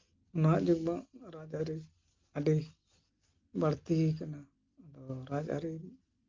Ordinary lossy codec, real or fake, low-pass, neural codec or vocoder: Opus, 24 kbps; real; 7.2 kHz; none